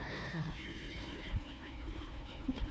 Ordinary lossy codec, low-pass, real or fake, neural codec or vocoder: none; none; fake; codec, 16 kHz, 1 kbps, FunCodec, trained on Chinese and English, 50 frames a second